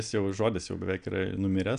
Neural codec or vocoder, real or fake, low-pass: none; real; 9.9 kHz